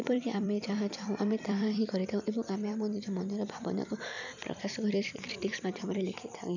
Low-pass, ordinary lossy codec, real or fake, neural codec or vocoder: 7.2 kHz; none; fake; vocoder, 44.1 kHz, 128 mel bands every 256 samples, BigVGAN v2